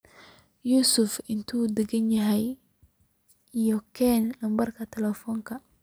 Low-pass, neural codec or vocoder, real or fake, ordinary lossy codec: none; none; real; none